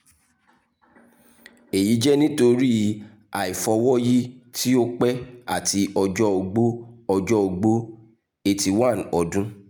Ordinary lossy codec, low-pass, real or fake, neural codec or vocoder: none; none; real; none